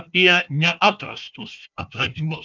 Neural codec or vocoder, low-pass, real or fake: codec, 16 kHz, 1 kbps, FunCodec, trained on Chinese and English, 50 frames a second; 7.2 kHz; fake